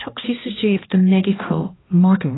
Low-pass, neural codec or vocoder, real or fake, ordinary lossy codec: 7.2 kHz; codec, 16 kHz, 1 kbps, X-Codec, HuBERT features, trained on balanced general audio; fake; AAC, 16 kbps